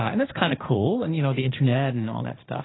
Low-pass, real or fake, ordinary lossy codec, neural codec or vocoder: 7.2 kHz; fake; AAC, 16 kbps; codec, 16 kHz, 1.1 kbps, Voila-Tokenizer